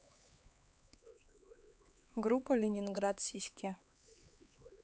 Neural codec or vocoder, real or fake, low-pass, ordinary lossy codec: codec, 16 kHz, 4 kbps, X-Codec, HuBERT features, trained on LibriSpeech; fake; none; none